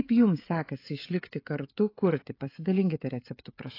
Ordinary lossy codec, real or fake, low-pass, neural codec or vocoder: AAC, 32 kbps; fake; 5.4 kHz; codec, 16 kHz, 16 kbps, FreqCodec, smaller model